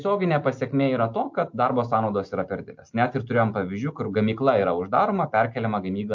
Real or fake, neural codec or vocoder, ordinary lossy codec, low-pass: real; none; MP3, 48 kbps; 7.2 kHz